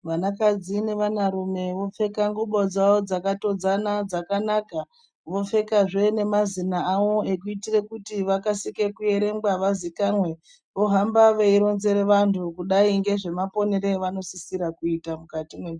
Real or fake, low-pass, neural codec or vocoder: real; 9.9 kHz; none